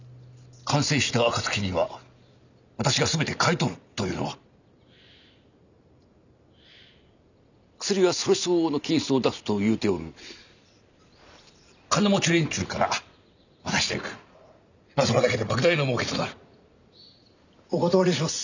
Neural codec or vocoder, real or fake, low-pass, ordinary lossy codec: none; real; 7.2 kHz; none